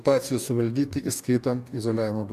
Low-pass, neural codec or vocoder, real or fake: 14.4 kHz; codec, 44.1 kHz, 2.6 kbps, DAC; fake